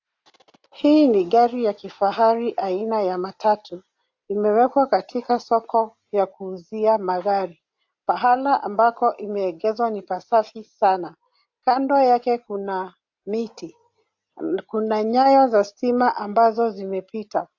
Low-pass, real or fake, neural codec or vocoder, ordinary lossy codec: 7.2 kHz; real; none; AAC, 48 kbps